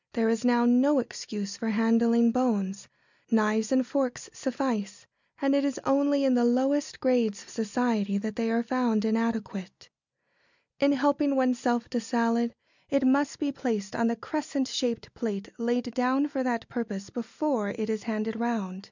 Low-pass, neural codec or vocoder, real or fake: 7.2 kHz; none; real